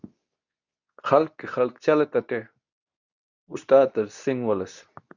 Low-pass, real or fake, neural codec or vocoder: 7.2 kHz; fake; codec, 24 kHz, 0.9 kbps, WavTokenizer, medium speech release version 2